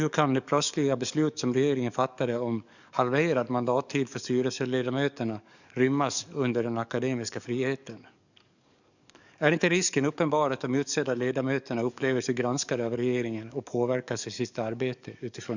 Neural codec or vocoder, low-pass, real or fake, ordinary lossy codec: codec, 44.1 kHz, 7.8 kbps, DAC; 7.2 kHz; fake; none